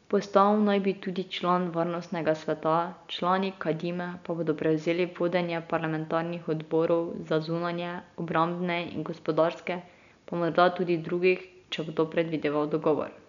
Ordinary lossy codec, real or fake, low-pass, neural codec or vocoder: none; real; 7.2 kHz; none